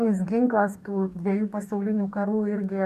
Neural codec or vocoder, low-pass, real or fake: codec, 44.1 kHz, 2.6 kbps, DAC; 14.4 kHz; fake